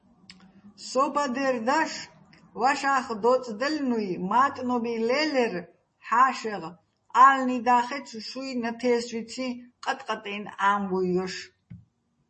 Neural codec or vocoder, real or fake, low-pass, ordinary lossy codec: none; real; 10.8 kHz; MP3, 32 kbps